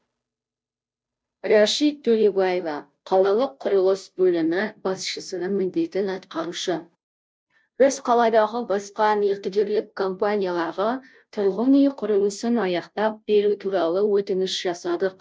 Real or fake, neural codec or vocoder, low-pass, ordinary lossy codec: fake; codec, 16 kHz, 0.5 kbps, FunCodec, trained on Chinese and English, 25 frames a second; none; none